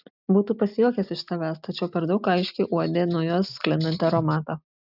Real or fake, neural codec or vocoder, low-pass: real; none; 5.4 kHz